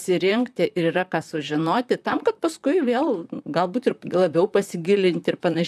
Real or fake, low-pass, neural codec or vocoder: fake; 14.4 kHz; vocoder, 44.1 kHz, 128 mel bands, Pupu-Vocoder